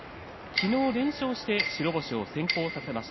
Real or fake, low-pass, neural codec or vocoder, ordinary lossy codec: real; 7.2 kHz; none; MP3, 24 kbps